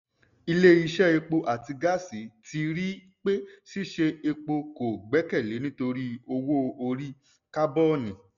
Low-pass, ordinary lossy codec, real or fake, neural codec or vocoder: 7.2 kHz; none; real; none